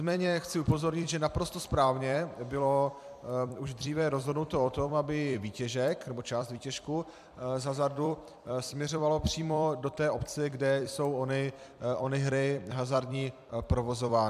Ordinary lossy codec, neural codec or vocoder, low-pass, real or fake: MP3, 96 kbps; vocoder, 44.1 kHz, 128 mel bands every 256 samples, BigVGAN v2; 14.4 kHz; fake